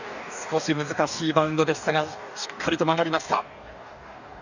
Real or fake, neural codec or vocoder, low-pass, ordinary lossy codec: fake; codec, 44.1 kHz, 2.6 kbps, DAC; 7.2 kHz; none